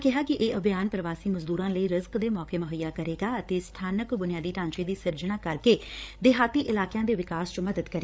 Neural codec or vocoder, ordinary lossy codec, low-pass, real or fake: codec, 16 kHz, 8 kbps, FreqCodec, larger model; none; none; fake